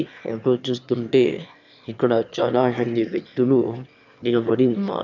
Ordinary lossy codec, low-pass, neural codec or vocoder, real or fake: none; 7.2 kHz; autoencoder, 22.05 kHz, a latent of 192 numbers a frame, VITS, trained on one speaker; fake